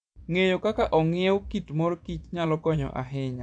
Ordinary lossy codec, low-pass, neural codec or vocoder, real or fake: none; 9.9 kHz; vocoder, 24 kHz, 100 mel bands, Vocos; fake